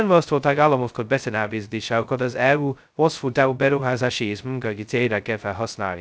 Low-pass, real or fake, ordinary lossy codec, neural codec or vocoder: none; fake; none; codec, 16 kHz, 0.2 kbps, FocalCodec